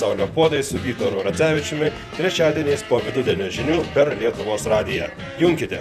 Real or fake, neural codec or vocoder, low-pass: fake; vocoder, 44.1 kHz, 128 mel bands, Pupu-Vocoder; 14.4 kHz